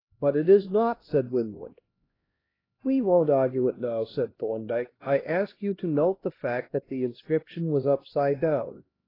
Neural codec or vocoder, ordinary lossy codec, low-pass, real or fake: codec, 16 kHz, 1 kbps, X-Codec, HuBERT features, trained on LibriSpeech; AAC, 24 kbps; 5.4 kHz; fake